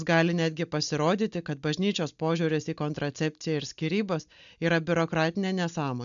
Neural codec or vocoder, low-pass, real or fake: none; 7.2 kHz; real